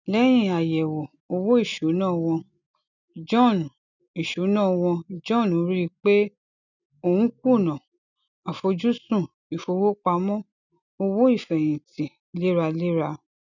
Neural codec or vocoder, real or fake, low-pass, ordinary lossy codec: none; real; 7.2 kHz; none